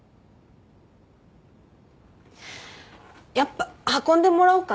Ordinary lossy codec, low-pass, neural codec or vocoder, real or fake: none; none; none; real